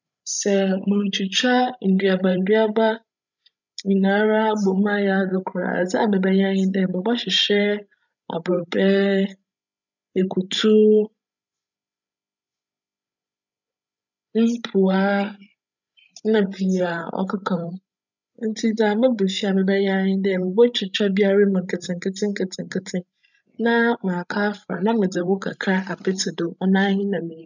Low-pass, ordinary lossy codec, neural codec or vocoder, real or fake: 7.2 kHz; none; codec, 16 kHz, 16 kbps, FreqCodec, larger model; fake